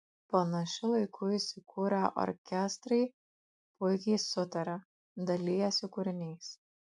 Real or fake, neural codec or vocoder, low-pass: real; none; 10.8 kHz